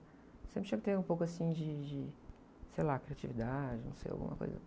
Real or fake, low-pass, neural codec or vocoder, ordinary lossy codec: real; none; none; none